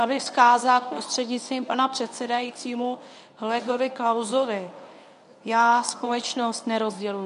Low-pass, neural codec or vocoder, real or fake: 10.8 kHz; codec, 24 kHz, 0.9 kbps, WavTokenizer, medium speech release version 1; fake